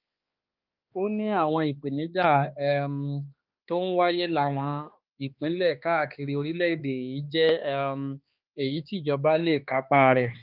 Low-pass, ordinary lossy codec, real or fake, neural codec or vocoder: 5.4 kHz; Opus, 24 kbps; fake; codec, 16 kHz, 2 kbps, X-Codec, HuBERT features, trained on balanced general audio